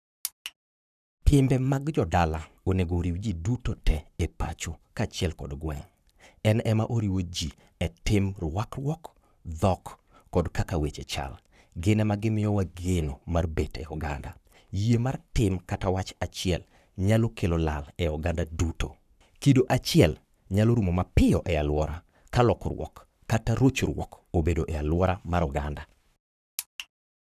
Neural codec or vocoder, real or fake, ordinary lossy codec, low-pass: codec, 44.1 kHz, 7.8 kbps, Pupu-Codec; fake; none; 14.4 kHz